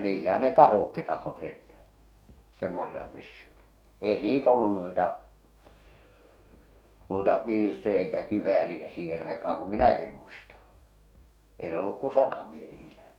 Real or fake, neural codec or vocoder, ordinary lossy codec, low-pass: fake; codec, 44.1 kHz, 2.6 kbps, DAC; none; 19.8 kHz